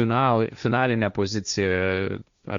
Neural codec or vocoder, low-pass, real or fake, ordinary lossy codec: codec, 16 kHz, 1.1 kbps, Voila-Tokenizer; 7.2 kHz; fake; Opus, 64 kbps